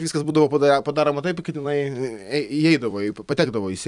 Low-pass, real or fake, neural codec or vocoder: 10.8 kHz; fake; vocoder, 44.1 kHz, 128 mel bands every 256 samples, BigVGAN v2